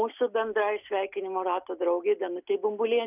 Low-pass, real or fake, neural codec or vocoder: 3.6 kHz; real; none